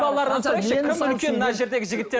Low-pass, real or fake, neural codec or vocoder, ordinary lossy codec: none; real; none; none